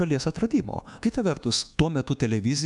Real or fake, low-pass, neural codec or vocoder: fake; 10.8 kHz; codec, 24 kHz, 1.2 kbps, DualCodec